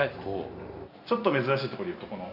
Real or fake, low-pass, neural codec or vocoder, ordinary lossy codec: real; 5.4 kHz; none; none